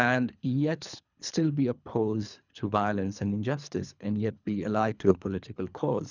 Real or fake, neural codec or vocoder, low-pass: fake; codec, 24 kHz, 3 kbps, HILCodec; 7.2 kHz